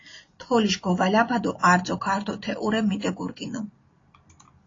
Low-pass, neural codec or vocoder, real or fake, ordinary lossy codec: 7.2 kHz; none; real; AAC, 32 kbps